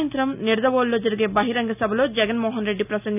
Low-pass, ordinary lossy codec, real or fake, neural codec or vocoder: 3.6 kHz; none; real; none